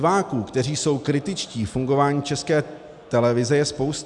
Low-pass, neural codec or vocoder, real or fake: 10.8 kHz; none; real